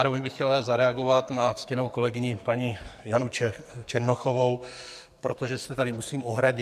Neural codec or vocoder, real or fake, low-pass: codec, 44.1 kHz, 2.6 kbps, SNAC; fake; 14.4 kHz